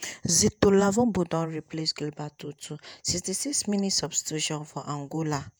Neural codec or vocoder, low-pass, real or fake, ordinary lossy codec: vocoder, 48 kHz, 128 mel bands, Vocos; none; fake; none